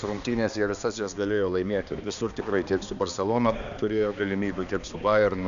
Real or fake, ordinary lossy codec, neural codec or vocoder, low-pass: fake; AAC, 96 kbps; codec, 16 kHz, 2 kbps, X-Codec, HuBERT features, trained on balanced general audio; 7.2 kHz